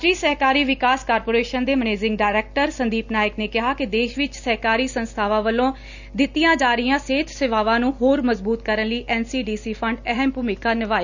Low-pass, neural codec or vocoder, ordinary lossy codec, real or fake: 7.2 kHz; none; none; real